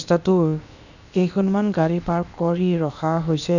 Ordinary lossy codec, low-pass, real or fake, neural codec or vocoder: none; 7.2 kHz; fake; codec, 16 kHz, about 1 kbps, DyCAST, with the encoder's durations